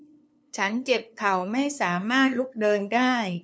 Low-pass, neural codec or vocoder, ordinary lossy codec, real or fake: none; codec, 16 kHz, 2 kbps, FunCodec, trained on LibriTTS, 25 frames a second; none; fake